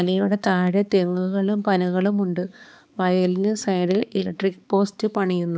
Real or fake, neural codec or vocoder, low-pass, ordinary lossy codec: fake; codec, 16 kHz, 4 kbps, X-Codec, HuBERT features, trained on balanced general audio; none; none